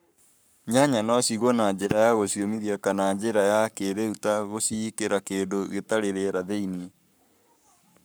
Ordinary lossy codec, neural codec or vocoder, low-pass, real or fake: none; codec, 44.1 kHz, 7.8 kbps, Pupu-Codec; none; fake